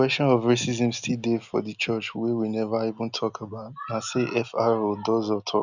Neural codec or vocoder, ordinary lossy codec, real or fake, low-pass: none; none; real; 7.2 kHz